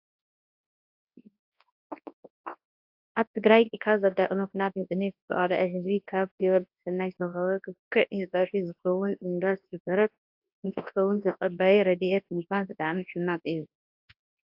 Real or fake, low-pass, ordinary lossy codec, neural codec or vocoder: fake; 5.4 kHz; AAC, 48 kbps; codec, 24 kHz, 0.9 kbps, WavTokenizer, large speech release